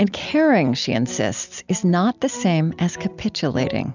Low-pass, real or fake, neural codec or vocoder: 7.2 kHz; real; none